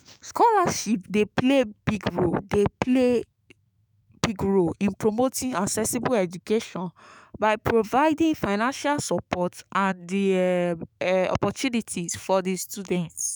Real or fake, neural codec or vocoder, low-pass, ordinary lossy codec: fake; autoencoder, 48 kHz, 128 numbers a frame, DAC-VAE, trained on Japanese speech; none; none